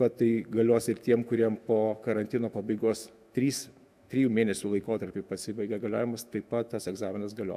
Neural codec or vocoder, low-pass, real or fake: autoencoder, 48 kHz, 128 numbers a frame, DAC-VAE, trained on Japanese speech; 14.4 kHz; fake